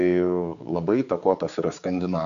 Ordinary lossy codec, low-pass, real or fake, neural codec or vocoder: AAC, 96 kbps; 7.2 kHz; fake; codec, 16 kHz, 4 kbps, X-Codec, HuBERT features, trained on general audio